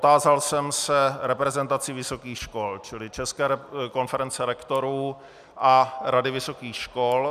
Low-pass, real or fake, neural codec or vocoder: 14.4 kHz; real; none